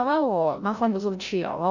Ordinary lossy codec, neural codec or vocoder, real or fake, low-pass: none; codec, 16 kHz, 0.5 kbps, FreqCodec, larger model; fake; 7.2 kHz